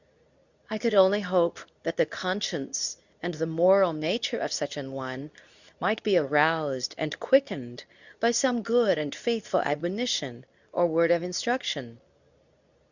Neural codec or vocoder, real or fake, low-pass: codec, 24 kHz, 0.9 kbps, WavTokenizer, medium speech release version 2; fake; 7.2 kHz